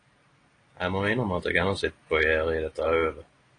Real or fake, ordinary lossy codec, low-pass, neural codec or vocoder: real; AAC, 32 kbps; 9.9 kHz; none